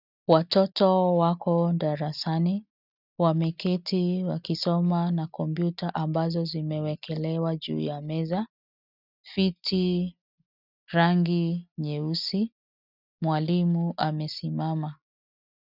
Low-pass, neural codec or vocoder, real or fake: 5.4 kHz; none; real